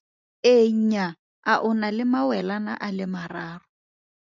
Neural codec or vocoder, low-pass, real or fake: none; 7.2 kHz; real